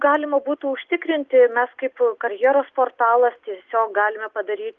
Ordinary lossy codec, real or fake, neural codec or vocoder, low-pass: Opus, 32 kbps; real; none; 7.2 kHz